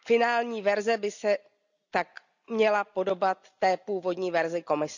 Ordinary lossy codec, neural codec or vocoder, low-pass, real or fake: none; none; 7.2 kHz; real